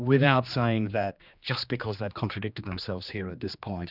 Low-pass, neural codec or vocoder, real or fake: 5.4 kHz; codec, 16 kHz, 2 kbps, X-Codec, HuBERT features, trained on general audio; fake